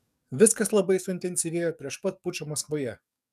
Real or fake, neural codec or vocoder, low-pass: fake; codec, 44.1 kHz, 7.8 kbps, DAC; 14.4 kHz